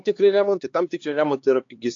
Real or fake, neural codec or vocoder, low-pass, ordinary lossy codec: fake; codec, 16 kHz, 4 kbps, X-Codec, HuBERT features, trained on LibriSpeech; 7.2 kHz; AAC, 48 kbps